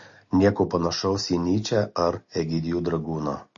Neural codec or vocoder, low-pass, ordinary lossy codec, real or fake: none; 7.2 kHz; MP3, 32 kbps; real